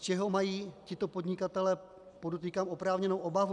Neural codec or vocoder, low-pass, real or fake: none; 10.8 kHz; real